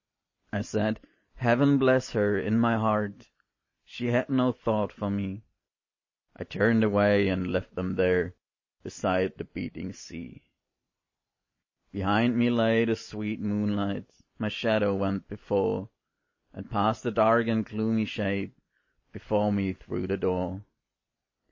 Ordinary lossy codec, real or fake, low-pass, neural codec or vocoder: MP3, 32 kbps; real; 7.2 kHz; none